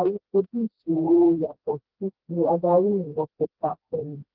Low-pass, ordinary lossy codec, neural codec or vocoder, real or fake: 5.4 kHz; Opus, 16 kbps; codec, 16 kHz, 1 kbps, FreqCodec, smaller model; fake